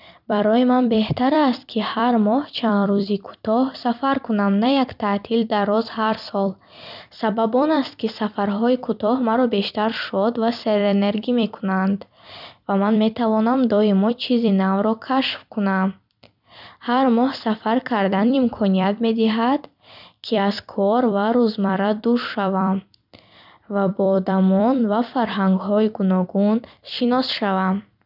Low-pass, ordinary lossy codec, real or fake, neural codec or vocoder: 5.4 kHz; none; fake; vocoder, 44.1 kHz, 128 mel bands every 256 samples, BigVGAN v2